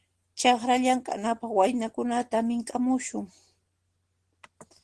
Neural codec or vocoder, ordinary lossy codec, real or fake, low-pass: none; Opus, 16 kbps; real; 10.8 kHz